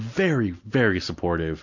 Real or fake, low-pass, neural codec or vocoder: real; 7.2 kHz; none